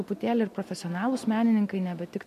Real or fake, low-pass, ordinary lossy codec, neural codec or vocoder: real; 14.4 kHz; MP3, 64 kbps; none